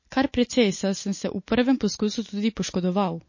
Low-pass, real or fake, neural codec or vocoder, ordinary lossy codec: 7.2 kHz; real; none; MP3, 32 kbps